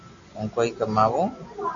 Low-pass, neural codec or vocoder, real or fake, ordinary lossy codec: 7.2 kHz; none; real; AAC, 64 kbps